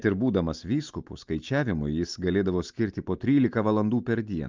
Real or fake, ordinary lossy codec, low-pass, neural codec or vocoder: real; Opus, 24 kbps; 7.2 kHz; none